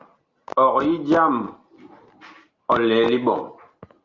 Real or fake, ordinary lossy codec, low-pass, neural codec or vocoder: real; Opus, 32 kbps; 7.2 kHz; none